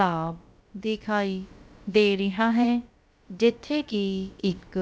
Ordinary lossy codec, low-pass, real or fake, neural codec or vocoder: none; none; fake; codec, 16 kHz, about 1 kbps, DyCAST, with the encoder's durations